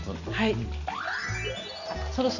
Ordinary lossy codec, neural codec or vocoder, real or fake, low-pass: none; none; real; 7.2 kHz